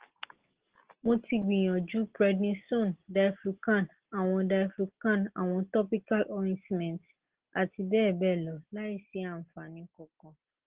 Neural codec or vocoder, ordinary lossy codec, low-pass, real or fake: none; Opus, 16 kbps; 3.6 kHz; real